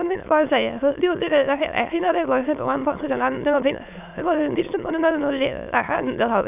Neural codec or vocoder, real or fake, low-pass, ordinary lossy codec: autoencoder, 22.05 kHz, a latent of 192 numbers a frame, VITS, trained on many speakers; fake; 3.6 kHz; none